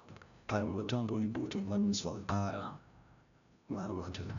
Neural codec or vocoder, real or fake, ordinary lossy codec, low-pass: codec, 16 kHz, 0.5 kbps, FreqCodec, larger model; fake; none; 7.2 kHz